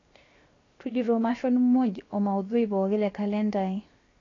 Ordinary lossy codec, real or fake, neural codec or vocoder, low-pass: AAC, 32 kbps; fake; codec, 16 kHz, 0.7 kbps, FocalCodec; 7.2 kHz